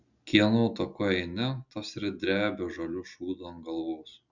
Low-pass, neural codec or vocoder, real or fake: 7.2 kHz; none; real